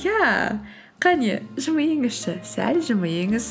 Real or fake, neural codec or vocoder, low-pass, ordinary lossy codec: real; none; none; none